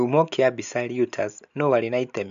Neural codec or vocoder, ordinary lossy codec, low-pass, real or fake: none; AAC, 64 kbps; 7.2 kHz; real